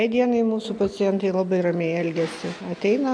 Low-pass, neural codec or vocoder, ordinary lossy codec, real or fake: 9.9 kHz; none; AAC, 64 kbps; real